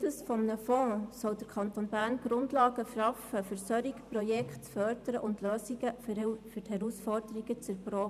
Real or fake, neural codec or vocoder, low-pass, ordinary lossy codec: fake; vocoder, 44.1 kHz, 128 mel bands every 512 samples, BigVGAN v2; 14.4 kHz; none